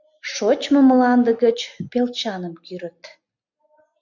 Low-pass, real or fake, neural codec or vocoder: 7.2 kHz; real; none